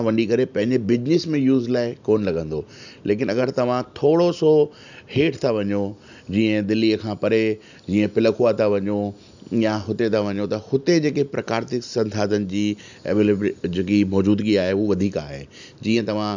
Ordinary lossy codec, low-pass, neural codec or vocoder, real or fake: none; 7.2 kHz; none; real